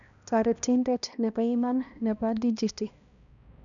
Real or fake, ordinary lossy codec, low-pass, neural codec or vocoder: fake; none; 7.2 kHz; codec, 16 kHz, 1 kbps, X-Codec, HuBERT features, trained on balanced general audio